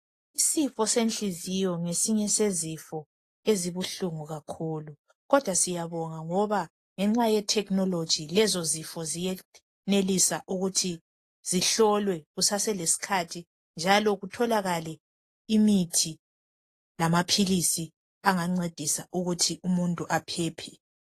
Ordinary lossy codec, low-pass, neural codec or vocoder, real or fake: AAC, 48 kbps; 14.4 kHz; none; real